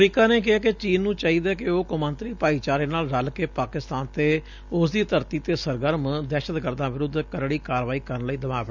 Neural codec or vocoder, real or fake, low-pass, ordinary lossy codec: none; real; 7.2 kHz; none